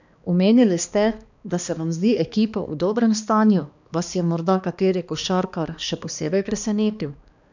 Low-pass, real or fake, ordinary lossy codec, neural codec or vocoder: 7.2 kHz; fake; none; codec, 16 kHz, 2 kbps, X-Codec, HuBERT features, trained on balanced general audio